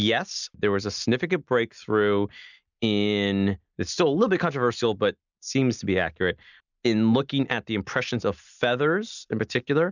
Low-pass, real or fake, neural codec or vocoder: 7.2 kHz; real; none